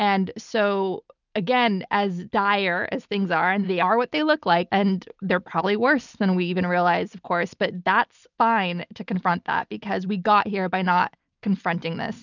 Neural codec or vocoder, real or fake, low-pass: none; real; 7.2 kHz